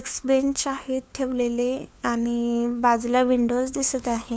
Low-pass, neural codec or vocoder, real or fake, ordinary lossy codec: none; codec, 16 kHz, 4 kbps, FunCodec, trained on LibriTTS, 50 frames a second; fake; none